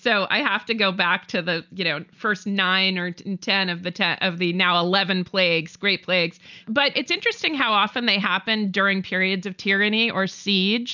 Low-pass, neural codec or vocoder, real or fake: 7.2 kHz; none; real